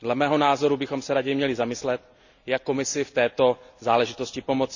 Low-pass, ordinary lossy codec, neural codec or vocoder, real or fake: 7.2 kHz; none; none; real